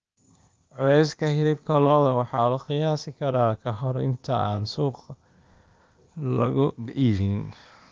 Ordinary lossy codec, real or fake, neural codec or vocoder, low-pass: Opus, 24 kbps; fake; codec, 16 kHz, 0.8 kbps, ZipCodec; 7.2 kHz